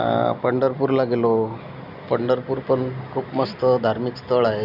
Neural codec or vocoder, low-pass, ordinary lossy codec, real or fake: none; 5.4 kHz; none; real